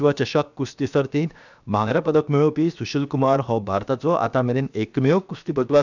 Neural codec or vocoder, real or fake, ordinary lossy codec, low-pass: codec, 16 kHz, 0.7 kbps, FocalCodec; fake; none; 7.2 kHz